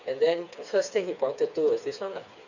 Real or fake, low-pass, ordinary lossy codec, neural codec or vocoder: fake; 7.2 kHz; none; codec, 16 kHz, 4 kbps, FreqCodec, smaller model